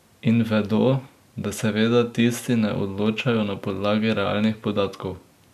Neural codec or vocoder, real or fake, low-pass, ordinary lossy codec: none; real; 14.4 kHz; none